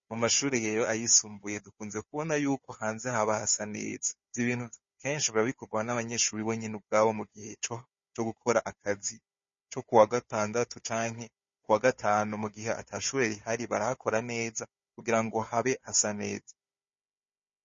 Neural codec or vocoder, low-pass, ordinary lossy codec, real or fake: codec, 16 kHz, 4 kbps, FunCodec, trained on Chinese and English, 50 frames a second; 7.2 kHz; MP3, 32 kbps; fake